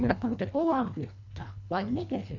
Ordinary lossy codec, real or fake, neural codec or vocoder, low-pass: none; fake; codec, 24 kHz, 1.5 kbps, HILCodec; 7.2 kHz